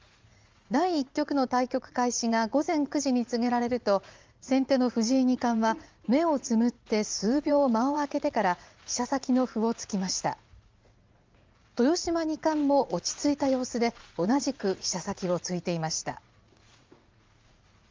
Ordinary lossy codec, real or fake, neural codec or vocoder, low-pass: Opus, 32 kbps; fake; vocoder, 44.1 kHz, 80 mel bands, Vocos; 7.2 kHz